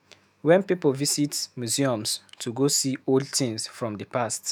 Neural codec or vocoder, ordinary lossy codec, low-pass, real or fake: autoencoder, 48 kHz, 128 numbers a frame, DAC-VAE, trained on Japanese speech; none; none; fake